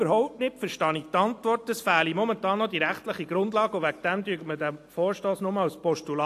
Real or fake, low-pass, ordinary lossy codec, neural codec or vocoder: real; 14.4 kHz; AAC, 64 kbps; none